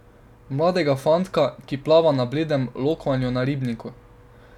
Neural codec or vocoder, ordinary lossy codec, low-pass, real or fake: none; none; 19.8 kHz; real